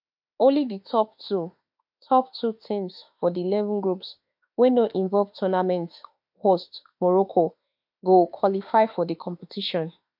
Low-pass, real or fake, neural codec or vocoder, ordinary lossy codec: 5.4 kHz; fake; autoencoder, 48 kHz, 32 numbers a frame, DAC-VAE, trained on Japanese speech; MP3, 48 kbps